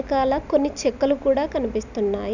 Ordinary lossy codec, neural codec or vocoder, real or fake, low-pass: none; none; real; 7.2 kHz